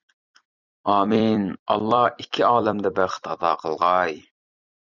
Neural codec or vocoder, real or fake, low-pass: vocoder, 44.1 kHz, 128 mel bands every 256 samples, BigVGAN v2; fake; 7.2 kHz